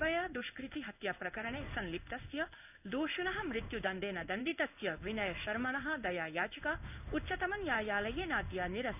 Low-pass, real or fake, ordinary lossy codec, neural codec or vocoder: 3.6 kHz; fake; none; codec, 16 kHz in and 24 kHz out, 1 kbps, XY-Tokenizer